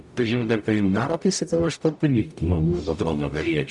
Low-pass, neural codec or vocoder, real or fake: 10.8 kHz; codec, 44.1 kHz, 0.9 kbps, DAC; fake